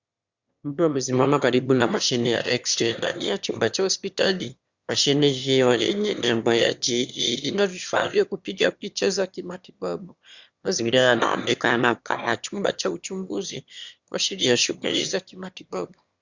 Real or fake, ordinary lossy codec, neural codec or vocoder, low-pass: fake; Opus, 64 kbps; autoencoder, 22.05 kHz, a latent of 192 numbers a frame, VITS, trained on one speaker; 7.2 kHz